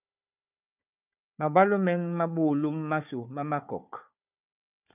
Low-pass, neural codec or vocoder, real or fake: 3.6 kHz; codec, 16 kHz, 4 kbps, FunCodec, trained on Chinese and English, 50 frames a second; fake